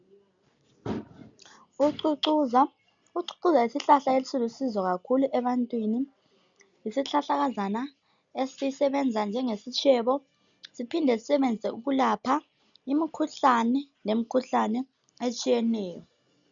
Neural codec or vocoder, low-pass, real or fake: none; 7.2 kHz; real